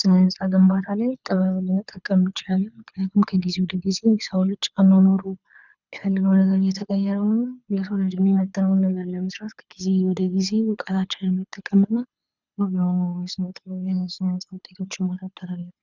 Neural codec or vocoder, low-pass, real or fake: codec, 24 kHz, 6 kbps, HILCodec; 7.2 kHz; fake